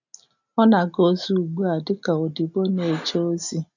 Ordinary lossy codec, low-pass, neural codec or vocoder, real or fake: none; 7.2 kHz; none; real